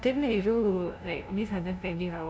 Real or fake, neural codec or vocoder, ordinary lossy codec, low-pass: fake; codec, 16 kHz, 0.5 kbps, FunCodec, trained on LibriTTS, 25 frames a second; none; none